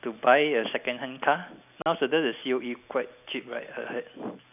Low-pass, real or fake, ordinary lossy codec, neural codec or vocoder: 3.6 kHz; real; none; none